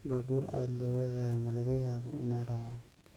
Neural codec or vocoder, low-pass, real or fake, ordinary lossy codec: codec, 44.1 kHz, 2.6 kbps, DAC; 19.8 kHz; fake; none